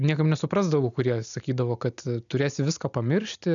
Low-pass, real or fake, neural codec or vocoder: 7.2 kHz; real; none